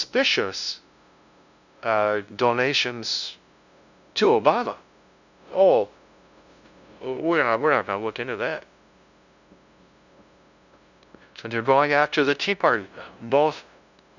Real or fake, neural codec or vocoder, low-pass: fake; codec, 16 kHz, 0.5 kbps, FunCodec, trained on LibriTTS, 25 frames a second; 7.2 kHz